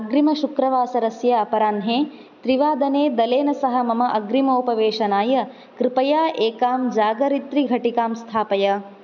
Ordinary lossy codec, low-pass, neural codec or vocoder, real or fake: none; 7.2 kHz; none; real